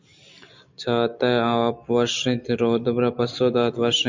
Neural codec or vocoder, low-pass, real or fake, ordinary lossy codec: none; 7.2 kHz; real; MP3, 64 kbps